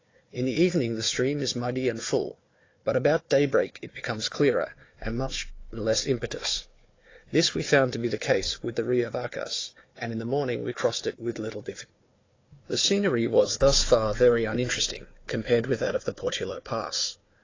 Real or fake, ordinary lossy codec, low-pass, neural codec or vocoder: fake; AAC, 32 kbps; 7.2 kHz; codec, 16 kHz, 4 kbps, FunCodec, trained on Chinese and English, 50 frames a second